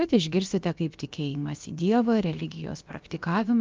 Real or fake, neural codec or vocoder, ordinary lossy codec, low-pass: fake; codec, 16 kHz, about 1 kbps, DyCAST, with the encoder's durations; Opus, 24 kbps; 7.2 kHz